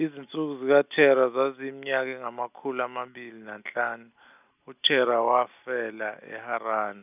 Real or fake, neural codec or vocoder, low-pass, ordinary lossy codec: real; none; 3.6 kHz; none